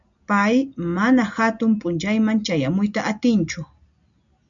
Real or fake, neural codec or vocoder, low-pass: real; none; 7.2 kHz